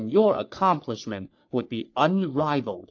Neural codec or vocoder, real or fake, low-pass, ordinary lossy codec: codec, 44.1 kHz, 3.4 kbps, Pupu-Codec; fake; 7.2 kHz; AAC, 48 kbps